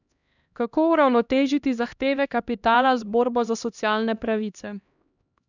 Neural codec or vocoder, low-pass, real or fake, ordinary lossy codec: codec, 16 kHz, 1 kbps, X-Codec, HuBERT features, trained on LibriSpeech; 7.2 kHz; fake; none